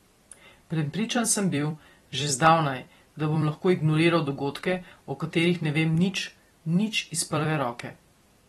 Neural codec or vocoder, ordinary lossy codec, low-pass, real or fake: vocoder, 44.1 kHz, 128 mel bands every 256 samples, BigVGAN v2; AAC, 32 kbps; 19.8 kHz; fake